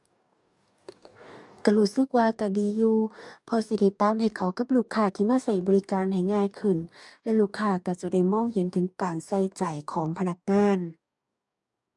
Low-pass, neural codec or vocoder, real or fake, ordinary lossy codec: 10.8 kHz; codec, 44.1 kHz, 2.6 kbps, DAC; fake; none